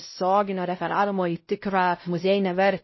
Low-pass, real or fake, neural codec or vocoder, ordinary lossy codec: 7.2 kHz; fake; codec, 16 kHz, 0.5 kbps, X-Codec, WavLM features, trained on Multilingual LibriSpeech; MP3, 24 kbps